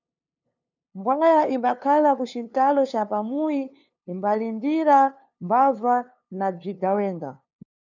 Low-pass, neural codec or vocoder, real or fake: 7.2 kHz; codec, 16 kHz, 2 kbps, FunCodec, trained on LibriTTS, 25 frames a second; fake